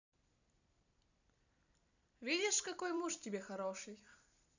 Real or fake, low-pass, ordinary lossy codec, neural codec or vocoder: fake; 7.2 kHz; none; vocoder, 44.1 kHz, 128 mel bands every 256 samples, BigVGAN v2